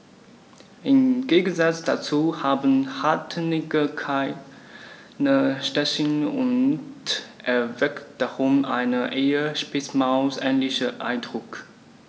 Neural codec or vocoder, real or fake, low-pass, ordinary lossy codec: none; real; none; none